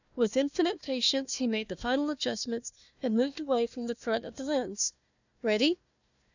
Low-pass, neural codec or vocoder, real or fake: 7.2 kHz; codec, 16 kHz, 1 kbps, FunCodec, trained on Chinese and English, 50 frames a second; fake